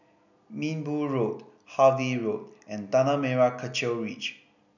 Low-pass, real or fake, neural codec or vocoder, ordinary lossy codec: 7.2 kHz; real; none; none